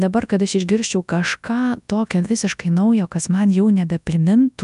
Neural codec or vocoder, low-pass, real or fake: codec, 24 kHz, 0.9 kbps, WavTokenizer, large speech release; 10.8 kHz; fake